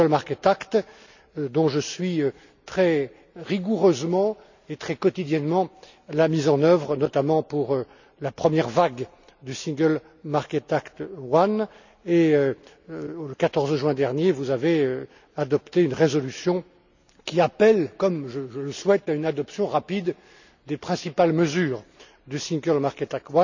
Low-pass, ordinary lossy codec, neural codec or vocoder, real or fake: 7.2 kHz; none; none; real